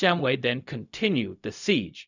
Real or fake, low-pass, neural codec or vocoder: fake; 7.2 kHz; codec, 16 kHz, 0.4 kbps, LongCat-Audio-Codec